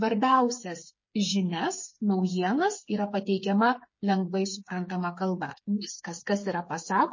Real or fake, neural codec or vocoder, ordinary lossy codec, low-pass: fake; codec, 16 kHz, 8 kbps, FreqCodec, smaller model; MP3, 32 kbps; 7.2 kHz